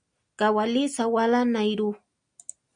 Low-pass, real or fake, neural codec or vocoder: 9.9 kHz; real; none